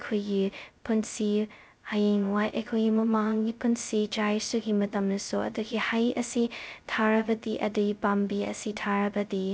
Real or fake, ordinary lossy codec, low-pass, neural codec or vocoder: fake; none; none; codec, 16 kHz, 0.2 kbps, FocalCodec